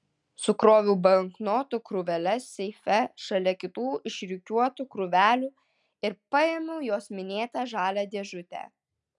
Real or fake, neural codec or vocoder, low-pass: real; none; 10.8 kHz